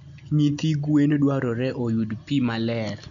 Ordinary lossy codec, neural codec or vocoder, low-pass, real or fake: none; none; 7.2 kHz; real